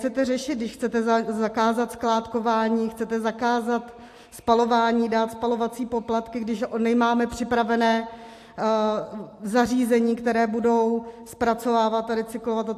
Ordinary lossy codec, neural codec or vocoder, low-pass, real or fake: AAC, 64 kbps; none; 14.4 kHz; real